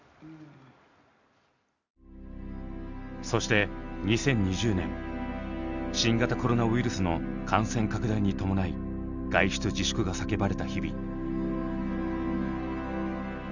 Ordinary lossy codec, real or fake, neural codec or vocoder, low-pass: none; real; none; 7.2 kHz